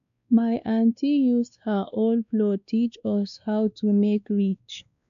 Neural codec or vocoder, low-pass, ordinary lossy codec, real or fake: codec, 16 kHz, 2 kbps, X-Codec, WavLM features, trained on Multilingual LibriSpeech; 7.2 kHz; none; fake